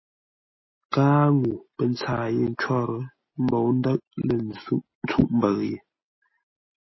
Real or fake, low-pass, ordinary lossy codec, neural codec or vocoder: real; 7.2 kHz; MP3, 24 kbps; none